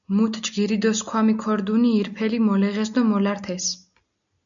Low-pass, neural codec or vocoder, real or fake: 7.2 kHz; none; real